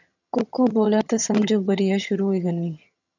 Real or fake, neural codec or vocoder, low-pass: fake; vocoder, 22.05 kHz, 80 mel bands, HiFi-GAN; 7.2 kHz